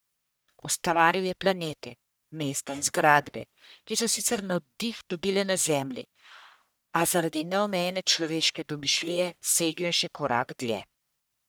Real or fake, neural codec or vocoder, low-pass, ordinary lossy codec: fake; codec, 44.1 kHz, 1.7 kbps, Pupu-Codec; none; none